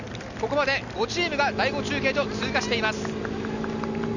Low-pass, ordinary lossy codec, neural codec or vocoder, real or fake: 7.2 kHz; none; none; real